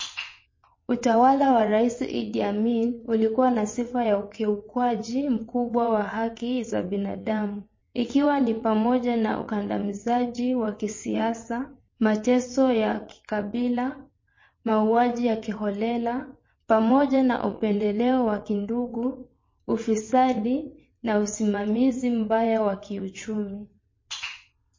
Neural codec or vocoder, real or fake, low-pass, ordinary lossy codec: vocoder, 22.05 kHz, 80 mel bands, WaveNeXt; fake; 7.2 kHz; MP3, 32 kbps